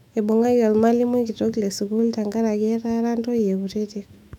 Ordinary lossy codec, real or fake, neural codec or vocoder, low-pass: none; fake; autoencoder, 48 kHz, 128 numbers a frame, DAC-VAE, trained on Japanese speech; 19.8 kHz